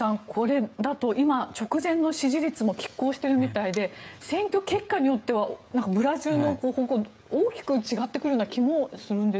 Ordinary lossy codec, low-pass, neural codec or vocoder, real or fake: none; none; codec, 16 kHz, 16 kbps, FreqCodec, smaller model; fake